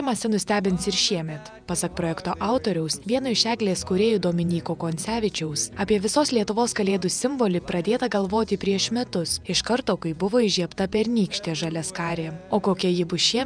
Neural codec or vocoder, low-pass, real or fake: vocoder, 48 kHz, 128 mel bands, Vocos; 9.9 kHz; fake